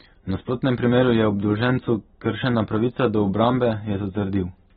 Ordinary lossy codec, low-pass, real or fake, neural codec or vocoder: AAC, 16 kbps; 19.8 kHz; real; none